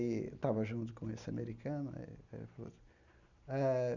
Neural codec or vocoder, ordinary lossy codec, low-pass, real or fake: none; none; 7.2 kHz; real